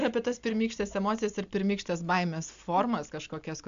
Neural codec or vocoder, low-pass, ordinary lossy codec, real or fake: none; 7.2 kHz; MP3, 64 kbps; real